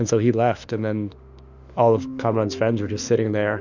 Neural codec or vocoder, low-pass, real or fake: autoencoder, 48 kHz, 32 numbers a frame, DAC-VAE, trained on Japanese speech; 7.2 kHz; fake